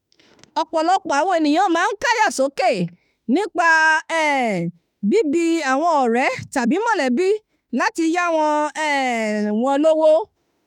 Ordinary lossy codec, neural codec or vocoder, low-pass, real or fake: none; autoencoder, 48 kHz, 32 numbers a frame, DAC-VAE, trained on Japanese speech; 19.8 kHz; fake